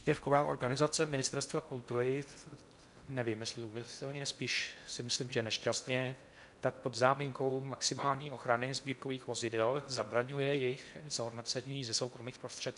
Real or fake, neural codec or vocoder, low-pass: fake; codec, 16 kHz in and 24 kHz out, 0.6 kbps, FocalCodec, streaming, 4096 codes; 10.8 kHz